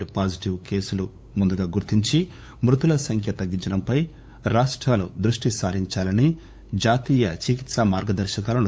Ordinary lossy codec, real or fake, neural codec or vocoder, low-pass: none; fake; codec, 16 kHz, 8 kbps, FunCodec, trained on LibriTTS, 25 frames a second; none